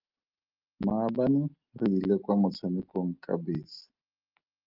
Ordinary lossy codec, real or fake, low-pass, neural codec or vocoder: Opus, 32 kbps; real; 5.4 kHz; none